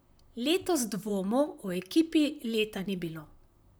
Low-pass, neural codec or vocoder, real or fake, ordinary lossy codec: none; vocoder, 44.1 kHz, 128 mel bands, Pupu-Vocoder; fake; none